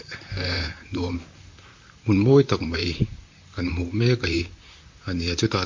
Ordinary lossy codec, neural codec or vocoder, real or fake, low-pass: MP3, 48 kbps; vocoder, 44.1 kHz, 128 mel bands, Pupu-Vocoder; fake; 7.2 kHz